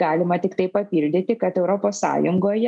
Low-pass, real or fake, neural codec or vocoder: 10.8 kHz; real; none